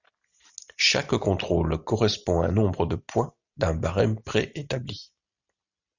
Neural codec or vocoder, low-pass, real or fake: none; 7.2 kHz; real